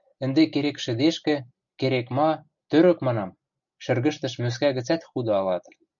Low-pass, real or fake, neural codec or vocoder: 7.2 kHz; real; none